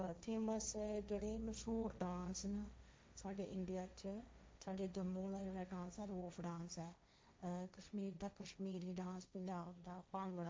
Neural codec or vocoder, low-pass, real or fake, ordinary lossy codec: codec, 16 kHz, 1.1 kbps, Voila-Tokenizer; none; fake; none